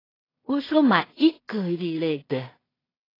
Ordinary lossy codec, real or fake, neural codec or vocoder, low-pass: AAC, 24 kbps; fake; codec, 16 kHz in and 24 kHz out, 0.4 kbps, LongCat-Audio-Codec, two codebook decoder; 5.4 kHz